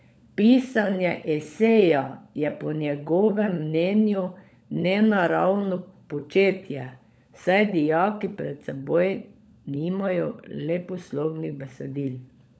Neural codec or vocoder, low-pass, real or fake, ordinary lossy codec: codec, 16 kHz, 16 kbps, FunCodec, trained on LibriTTS, 50 frames a second; none; fake; none